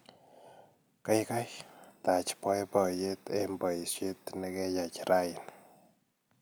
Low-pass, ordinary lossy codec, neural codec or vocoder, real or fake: none; none; none; real